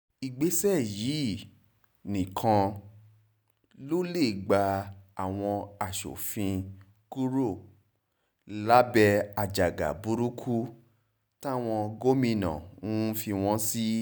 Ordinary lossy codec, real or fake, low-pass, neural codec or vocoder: none; real; none; none